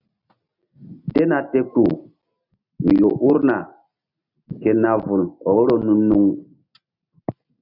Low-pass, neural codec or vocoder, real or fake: 5.4 kHz; none; real